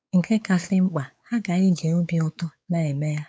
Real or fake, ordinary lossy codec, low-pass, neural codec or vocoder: fake; none; none; codec, 16 kHz, 4 kbps, X-Codec, WavLM features, trained on Multilingual LibriSpeech